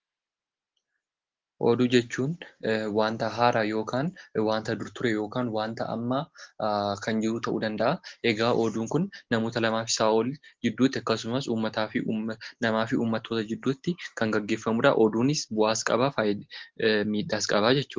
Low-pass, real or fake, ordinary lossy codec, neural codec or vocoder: 7.2 kHz; real; Opus, 16 kbps; none